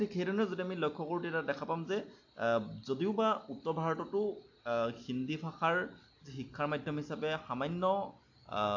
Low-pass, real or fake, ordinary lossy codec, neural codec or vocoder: 7.2 kHz; real; AAC, 48 kbps; none